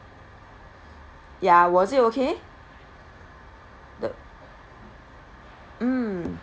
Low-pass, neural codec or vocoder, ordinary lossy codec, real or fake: none; none; none; real